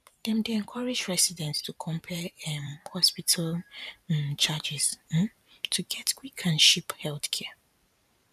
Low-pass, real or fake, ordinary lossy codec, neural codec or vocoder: 14.4 kHz; fake; none; vocoder, 44.1 kHz, 128 mel bands every 512 samples, BigVGAN v2